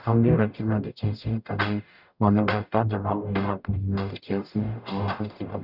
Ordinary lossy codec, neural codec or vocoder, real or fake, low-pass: none; codec, 44.1 kHz, 0.9 kbps, DAC; fake; 5.4 kHz